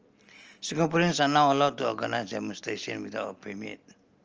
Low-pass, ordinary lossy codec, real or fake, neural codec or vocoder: 7.2 kHz; Opus, 24 kbps; real; none